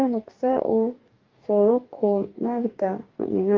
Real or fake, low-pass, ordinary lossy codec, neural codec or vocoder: fake; 7.2 kHz; Opus, 32 kbps; codec, 44.1 kHz, 2.6 kbps, DAC